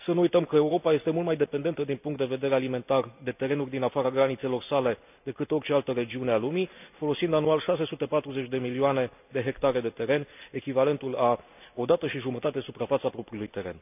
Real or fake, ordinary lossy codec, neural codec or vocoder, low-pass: real; none; none; 3.6 kHz